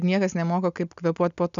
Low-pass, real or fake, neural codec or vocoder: 7.2 kHz; real; none